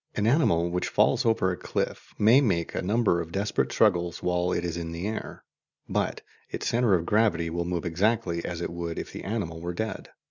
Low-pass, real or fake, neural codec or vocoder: 7.2 kHz; real; none